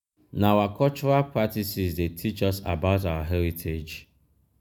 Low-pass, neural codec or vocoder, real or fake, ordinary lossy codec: none; none; real; none